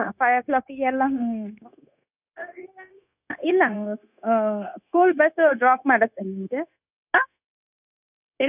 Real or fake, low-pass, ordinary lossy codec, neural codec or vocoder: fake; 3.6 kHz; none; codec, 16 kHz, 0.9 kbps, LongCat-Audio-Codec